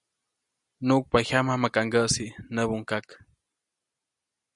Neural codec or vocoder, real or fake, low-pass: none; real; 10.8 kHz